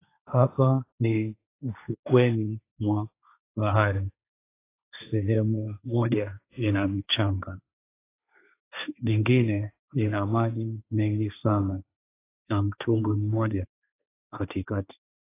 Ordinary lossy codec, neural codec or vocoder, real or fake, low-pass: AAC, 24 kbps; codec, 16 kHz, 1.1 kbps, Voila-Tokenizer; fake; 3.6 kHz